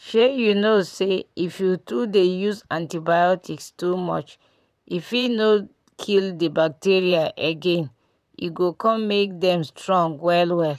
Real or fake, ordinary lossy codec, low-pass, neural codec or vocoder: fake; none; 14.4 kHz; vocoder, 44.1 kHz, 128 mel bands, Pupu-Vocoder